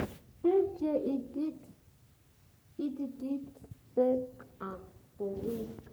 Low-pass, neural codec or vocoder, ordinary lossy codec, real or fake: none; codec, 44.1 kHz, 3.4 kbps, Pupu-Codec; none; fake